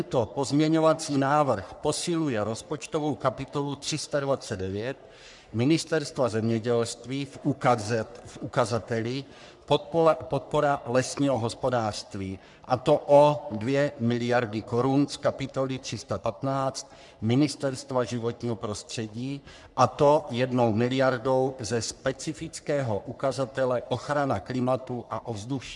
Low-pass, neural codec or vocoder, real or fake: 10.8 kHz; codec, 44.1 kHz, 3.4 kbps, Pupu-Codec; fake